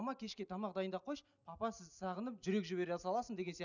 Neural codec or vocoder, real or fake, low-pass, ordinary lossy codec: none; real; 7.2 kHz; none